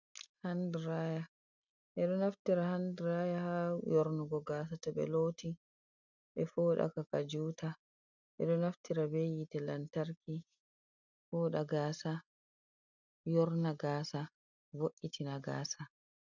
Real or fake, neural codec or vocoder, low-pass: real; none; 7.2 kHz